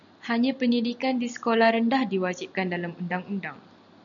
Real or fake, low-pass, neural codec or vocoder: real; 7.2 kHz; none